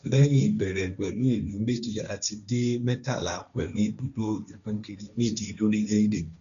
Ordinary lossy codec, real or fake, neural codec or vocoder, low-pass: none; fake; codec, 16 kHz, 1.1 kbps, Voila-Tokenizer; 7.2 kHz